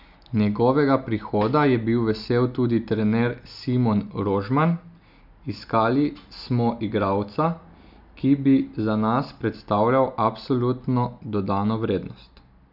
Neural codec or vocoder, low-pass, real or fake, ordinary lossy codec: none; 5.4 kHz; real; none